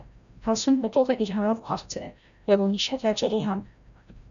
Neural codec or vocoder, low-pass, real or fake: codec, 16 kHz, 0.5 kbps, FreqCodec, larger model; 7.2 kHz; fake